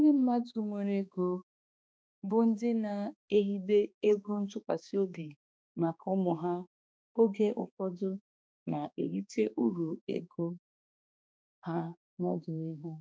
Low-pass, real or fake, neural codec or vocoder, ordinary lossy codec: none; fake; codec, 16 kHz, 2 kbps, X-Codec, HuBERT features, trained on balanced general audio; none